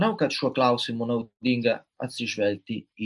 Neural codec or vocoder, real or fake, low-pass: none; real; 10.8 kHz